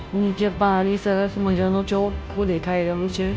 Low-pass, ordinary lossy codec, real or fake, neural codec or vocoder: none; none; fake; codec, 16 kHz, 0.5 kbps, FunCodec, trained on Chinese and English, 25 frames a second